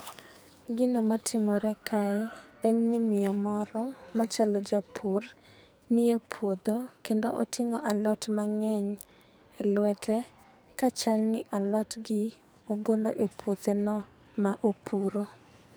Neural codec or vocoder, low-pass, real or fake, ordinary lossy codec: codec, 44.1 kHz, 2.6 kbps, SNAC; none; fake; none